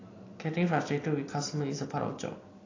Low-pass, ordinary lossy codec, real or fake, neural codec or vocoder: 7.2 kHz; AAC, 32 kbps; real; none